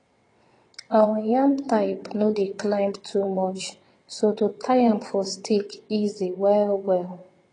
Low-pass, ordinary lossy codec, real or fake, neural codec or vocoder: 9.9 kHz; AAC, 32 kbps; fake; vocoder, 22.05 kHz, 80 mel bands, WaveNeXt